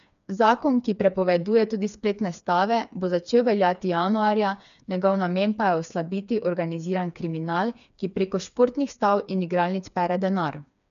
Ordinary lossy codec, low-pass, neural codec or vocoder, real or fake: none; 7.2 kHz; codec, 16 kHz, 4 kbps, FreqCodec, smaller model; fake